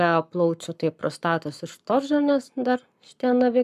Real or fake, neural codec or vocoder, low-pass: fake; codec, 44.1 kHz, 7.8 kbps, Pupu-Codec; 14.4 kHz